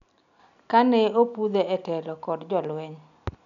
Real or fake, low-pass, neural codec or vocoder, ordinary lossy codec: real; 7.2 kHz; none; none